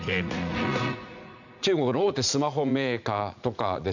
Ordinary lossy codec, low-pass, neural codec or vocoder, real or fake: none; 7.2 kHz; vocoder, 44.1 kHz, 80 mel bands, Vocos; fake